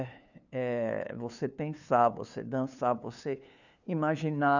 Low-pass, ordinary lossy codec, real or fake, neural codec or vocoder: 7.2 kHz; none; fake; codec, 16 kHz, 2 kbps, FunCodec, trained on LibriTTS, 25 frames a second